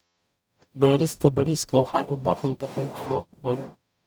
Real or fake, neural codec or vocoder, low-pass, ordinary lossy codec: fake; codec, 44.1 kHz, 0.9 kbps, DAC; none; none